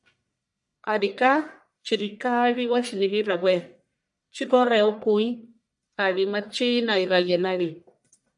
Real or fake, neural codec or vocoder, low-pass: fake; codec, 44.1 kHz, 1.7 kbps, Pupu-Codec; 10.8 kHz